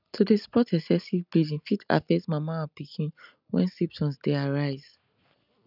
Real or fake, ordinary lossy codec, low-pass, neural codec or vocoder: real; none; 5.4 kHz; none